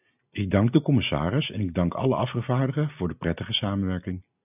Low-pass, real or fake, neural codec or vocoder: 3.6 kHz; real; none